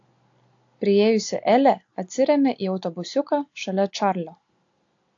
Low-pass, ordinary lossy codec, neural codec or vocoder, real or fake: 7.2 kHz; AAC, 48 kbps; none; real